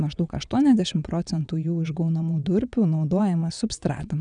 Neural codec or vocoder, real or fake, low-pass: vocoder, 22.05 kHz, 80 mel bands, WaveNeXt; fake; 9.9 kHz